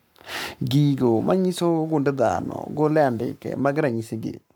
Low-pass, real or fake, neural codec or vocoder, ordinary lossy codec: none; fake; codec, 44.1 kHz, 7.8 kbps, Pupu-Codec; none